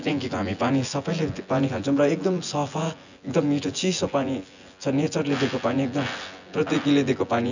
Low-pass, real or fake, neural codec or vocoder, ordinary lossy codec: 7.2 kHz; fake; vocoder, 24 kHz, 100 mel bands, Vocos; none